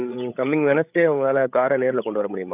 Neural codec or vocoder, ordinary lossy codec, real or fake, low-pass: codec, 16 kHz, 8 kbps, FreqCodec, larger model; none; fake; 3.6 kHz